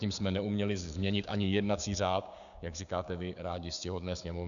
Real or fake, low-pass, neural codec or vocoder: fake; 7.2 kHz; codec, 16 kHz, 6 kbps, DAC